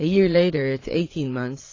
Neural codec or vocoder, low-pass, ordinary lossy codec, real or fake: vocoder, 22.05 kHz, 80 mel bands, Vocos; 7.2 kHz; AAC, 32 kbps; fake